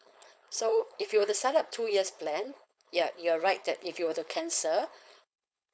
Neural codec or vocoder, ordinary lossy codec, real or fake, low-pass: codec, 16 kHz, 4.8 kbps, FACodec; none; fake; none